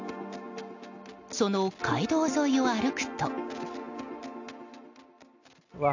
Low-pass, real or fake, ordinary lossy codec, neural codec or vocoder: 7.2 kHz; real; AAC, 48 kbps; none